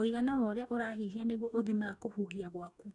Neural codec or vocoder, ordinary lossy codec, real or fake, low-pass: codec, 44.1 kHz, 2.6 kbps, DAC; none; fake; 10.8 kHz